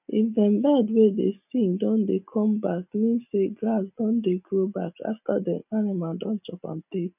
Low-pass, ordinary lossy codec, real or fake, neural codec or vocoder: 3.6 kHz; none; real; none